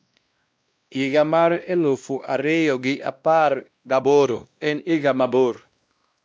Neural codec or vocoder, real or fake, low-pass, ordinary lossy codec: codec, 16 kHz, 1 kbps, X-Codec, WavLM features, trained on Multilingual LibriSpeech; fake; none; none